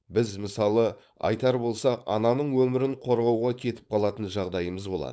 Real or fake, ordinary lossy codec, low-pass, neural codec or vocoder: fake; none; none; codec, 16 kHz, 4.8 kbps, FACodec